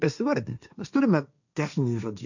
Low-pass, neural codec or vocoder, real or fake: 7.2 kHz; codec, 16 kHz, 1.1 kbps, Voila-Tokenizer; fake